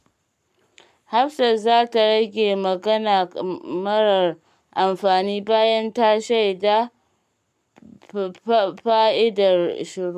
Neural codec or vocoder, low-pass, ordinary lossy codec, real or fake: codec, 44.1 kHz, 7.8 kbps, Pupu-Codec; 14.4 kHz; none; fake